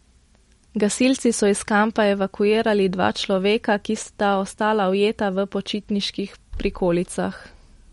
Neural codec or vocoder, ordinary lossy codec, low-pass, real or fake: none; MP3, 48 kbps; 14.4 kHz; real